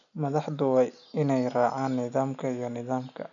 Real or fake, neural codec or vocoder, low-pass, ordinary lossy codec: real; none; 7.2 kHz; none